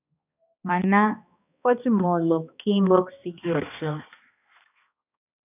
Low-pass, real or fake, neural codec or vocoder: 3.6 kHz; fake; codec, 16 kHz, 2 kbps, X-Codec, HuBERT features, trained on balanced general audio